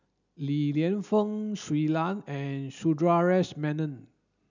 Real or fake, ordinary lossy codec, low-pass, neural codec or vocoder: real; none; 7.2 kHz; none